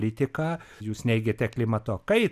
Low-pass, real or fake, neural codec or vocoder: 14.4 kHz; real; none